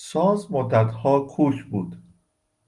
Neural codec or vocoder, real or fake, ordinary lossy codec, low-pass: none; real; Opus, 24 kbps; 10.8 kHz